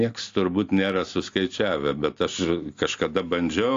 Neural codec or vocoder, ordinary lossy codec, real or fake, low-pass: none; AAC, 48 kbps; real; 7.2 kHz